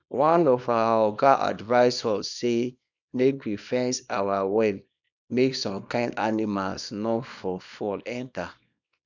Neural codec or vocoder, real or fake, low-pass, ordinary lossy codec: codec, 24 kHz, 0.9 kbps, WavTokenizer, small release; fake; 7.2 kHz; none